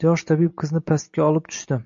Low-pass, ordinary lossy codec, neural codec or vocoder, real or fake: 7.2 kHz; AAC, 64 kbps; none; real